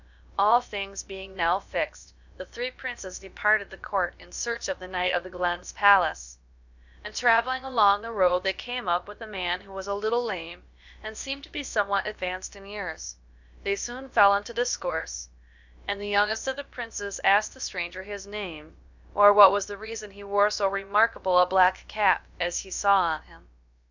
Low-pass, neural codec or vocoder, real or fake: 7.2 kHz; codec, 16 kHz, about 1 kbps, DyCAST, with the encoder's durations; fake